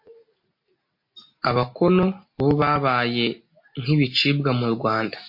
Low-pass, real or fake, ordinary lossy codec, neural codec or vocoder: 5.4 kHz; real; MP3, 32 kbps; none